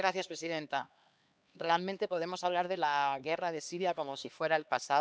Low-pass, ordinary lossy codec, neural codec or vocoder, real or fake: none; none; codec, 16 kHz, 2 kbps, X-Codec, HuBERT features, trained on LibriSpeech; fake